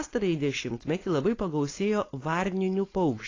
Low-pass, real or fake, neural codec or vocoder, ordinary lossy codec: 7.2 kHz; fake; codec, 16 kHz, 4.8 kbps, FACodec; AAC, 32 kbps